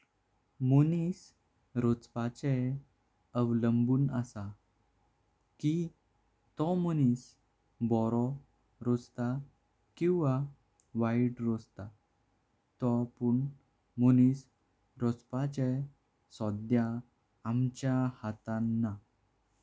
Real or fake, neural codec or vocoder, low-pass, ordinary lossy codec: real; none; none; none